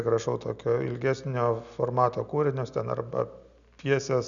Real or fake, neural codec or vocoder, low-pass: real; none; 7.2 kHz